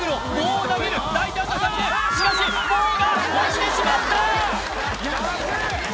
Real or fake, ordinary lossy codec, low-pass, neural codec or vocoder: real; none; none; none